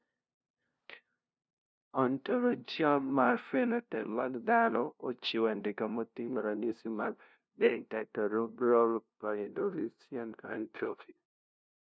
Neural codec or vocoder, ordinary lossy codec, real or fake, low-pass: codec, 16 kHz, 0.5 kbps, FunCodec, trained on LibriTTS, 25 frames a second; none; fake; none